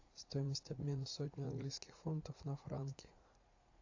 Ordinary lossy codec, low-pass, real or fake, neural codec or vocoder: AAC, 48 kbps; 7.2 kHz; fake; vocoder, 44.1 kHz, 80 mel bands, Vocos